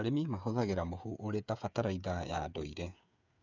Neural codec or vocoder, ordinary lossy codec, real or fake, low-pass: codec, 16 kHz, 8 kbps, FreqCodec, smaller model; none; fake; 7.2 kHz